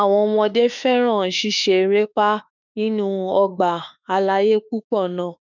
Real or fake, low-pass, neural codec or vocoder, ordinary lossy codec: fake; 7.2 kHz; autoencoder, 48 kHz, 32 numbers a frame, DAC-VAE, trained on Japanese speech; none